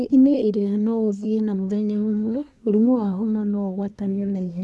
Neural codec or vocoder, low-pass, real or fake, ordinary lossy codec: codec, 24 kHz, 1 kbps, SNAC; none; fake; none